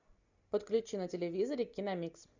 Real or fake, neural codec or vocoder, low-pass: real; none; 7.2 kHz